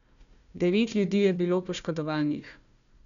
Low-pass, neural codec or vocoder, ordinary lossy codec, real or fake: 7.2 kHz; codec, 16 kHz, 1 kbps, FunCodec, trained on Chinese and English, 50 frames a second; none; fake